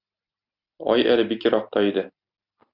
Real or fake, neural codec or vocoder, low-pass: real; none; 5.4 kHz